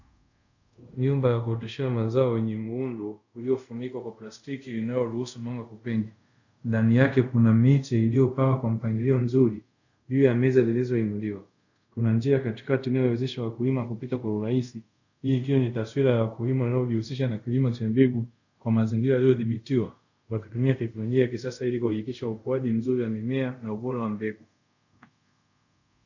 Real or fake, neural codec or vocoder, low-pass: fake; codec, 24 kHz, 0.5 kbps, DualCodec; 7.2 kHz